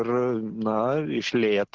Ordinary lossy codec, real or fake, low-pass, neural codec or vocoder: Opus, 16 kbps; real; 7.2 kHz; none